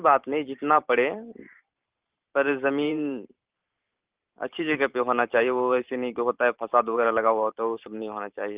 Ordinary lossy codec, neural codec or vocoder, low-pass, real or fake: Opus, 32 kbps; vocoder, 44.1 kHz, 128 mel bands every 512 samples, BigVGAN v2; 3.6 kHz; fake